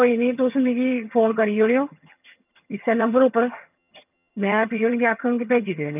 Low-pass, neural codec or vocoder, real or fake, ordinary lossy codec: 3.6 kHz; vocoder, 22.05 kHz, 80 mel bands, HiFi-GAN; fake; none